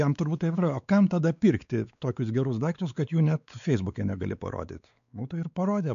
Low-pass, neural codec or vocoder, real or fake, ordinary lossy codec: 7.2 kHz; codec, 16 kHz, 4 kbps, X-Codec, WavLM features, trained on Multilingual LibriSpeech; fake; MP3, 96 kbps